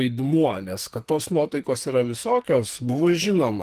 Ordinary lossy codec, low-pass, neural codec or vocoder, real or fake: Opus, 24 kbps; 14.4 kHz; codec, 44.1 kHz, 2.6 kbps, SNAC; fake